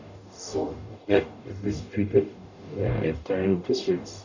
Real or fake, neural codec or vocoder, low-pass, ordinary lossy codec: fake; codec, 44.1 kHz, 0.9 kbps, DAC; 7.2 kHz; none